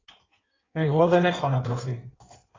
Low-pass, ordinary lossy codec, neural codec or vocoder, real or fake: 7.2 kHz; AAC, 32 kbps; codec, 16 kHz in and 24 kHz out, 1.1 kbps, FireRedTTS-2 codec; fake